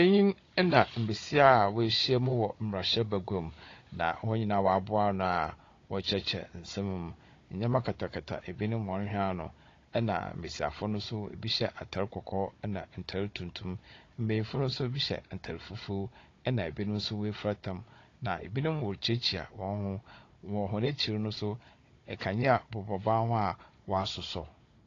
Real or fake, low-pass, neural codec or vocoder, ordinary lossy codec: fake; 7.2 kHz; codec, 16 kHz, 16 kbps, FunCodec, trained on Chinese and English, 50 frames a second; AAC, 32 kbps